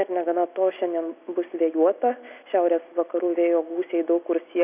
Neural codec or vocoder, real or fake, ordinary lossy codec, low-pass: none; real; AAC, 32 kbps; 3.6 kHz